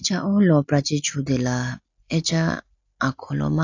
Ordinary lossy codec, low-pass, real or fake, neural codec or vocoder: AAC, 48 kbps; 7.2 kHz; real; none